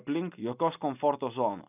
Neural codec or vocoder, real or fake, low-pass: none; real; 3.6 kHz